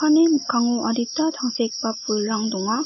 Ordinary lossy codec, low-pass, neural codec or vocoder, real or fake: MP3, 24 kbps; 7.2 kHz; none; real